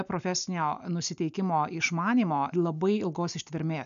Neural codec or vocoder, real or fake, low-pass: none; real; 7.2 kHz